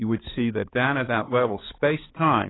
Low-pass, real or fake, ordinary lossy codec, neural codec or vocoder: 7.2 kHz; fake; AAC, 16 kbps; codec, 16 kHz, 4 kbps, X-Codec, HuBERT features, trained on balanced general audio